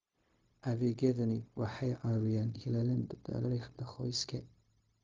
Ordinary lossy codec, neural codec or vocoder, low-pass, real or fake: Opus, 32 kbps; codec, 16 kHz, 0.4 kbps, LongCat-Audio-Codec; 7.2 kHz; fake